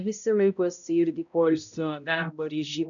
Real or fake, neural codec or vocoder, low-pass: fake; codec, 16 kHz, 0.5 kbps, X-Codec, HuBERT features, trained on balanced general audio; 7.2 kHz